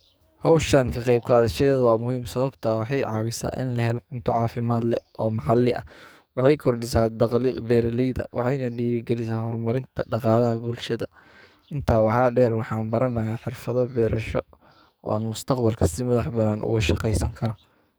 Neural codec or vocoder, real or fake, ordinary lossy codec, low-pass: codec, 44.1 kHz, 2.6 kbps, SNAC; fake; none; none